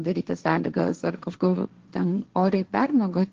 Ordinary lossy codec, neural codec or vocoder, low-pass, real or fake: Opus, 16 kbps; codec, 16 kHz, 1.1 kbps, Voila-Tokenizer; 7.2 kHz; fake